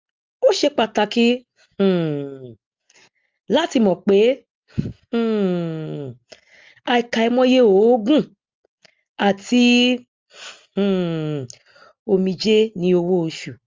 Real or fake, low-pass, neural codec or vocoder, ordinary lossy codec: real; 7.2 kHz; none; Opus, 32 kbps